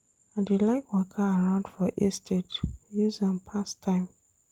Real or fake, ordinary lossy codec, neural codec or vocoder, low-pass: real; Opus, 24 kbps; none; 19.8 kHz